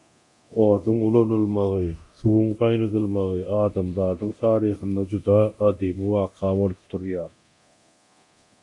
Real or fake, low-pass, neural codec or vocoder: fake; 10.8 kHz; codec, 24 kHz, 0.9 kbps, DualCodec